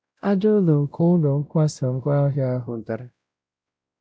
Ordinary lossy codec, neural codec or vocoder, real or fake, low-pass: none; codec, 16 kHz, 0.5 kbps, X-Codec, WavLM features, trained on Multilingual LibriSpeech; fake; none